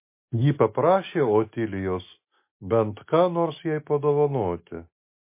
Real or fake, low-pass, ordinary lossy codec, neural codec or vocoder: real; 3.6 kHz; MP3, 24 kbps; none